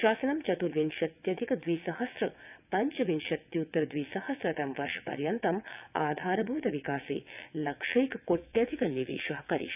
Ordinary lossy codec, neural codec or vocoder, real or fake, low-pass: none; vocoder, 22.05 kHz, 80 mel bands, WaveNeXt; fake; 3.6 kHz